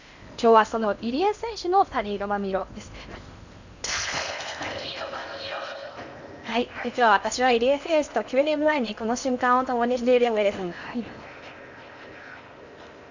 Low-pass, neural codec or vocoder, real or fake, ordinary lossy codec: 7.2 kHz; codec, 16 kHz in and 24 kHz out, 0.8 kbps, FocalCodec, streaming, 65536 codes; fake; none